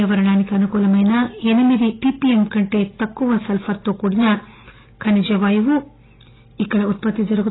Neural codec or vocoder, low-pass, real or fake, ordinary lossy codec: none; 7.2 kHz; real; AAC, 16 kbps